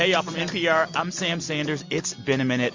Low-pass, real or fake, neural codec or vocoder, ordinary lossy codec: 7.2 kHz; real; none; MP3, 48 kbps